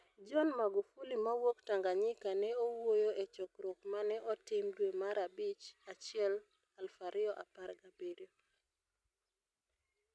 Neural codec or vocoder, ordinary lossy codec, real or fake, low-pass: none; none; real; none